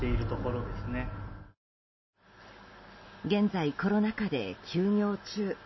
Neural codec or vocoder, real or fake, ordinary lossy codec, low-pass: none; real; MP3, 24 kbps; 7.2 kHz